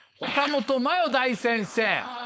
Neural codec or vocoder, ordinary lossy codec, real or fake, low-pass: codec, 16 kHz, 4.8 kbps, FACodec; none; fake; none